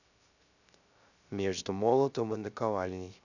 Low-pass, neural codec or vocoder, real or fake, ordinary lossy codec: 7.2 kHz; codec, 16 kHz, 0.3 kbps, FocalCodec; fake; none